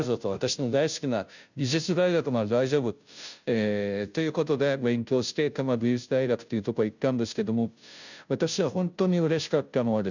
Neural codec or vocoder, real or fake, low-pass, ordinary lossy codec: codec, 16 kHz, 0.5 kbps, FunCodec, trained on Chinese and English, 25 frames a second; fake; 7.2 kHz; none